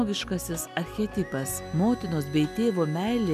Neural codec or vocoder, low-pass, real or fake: none; 14.4 kHz; real